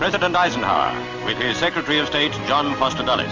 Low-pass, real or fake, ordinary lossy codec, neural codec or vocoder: 7.2 kHz; real; Opus, 32 kbps; none